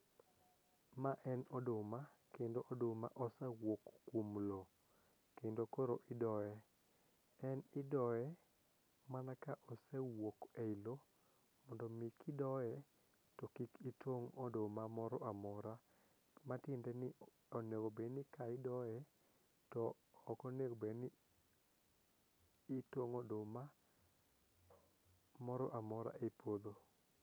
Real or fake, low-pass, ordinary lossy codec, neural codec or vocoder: real; none; none; none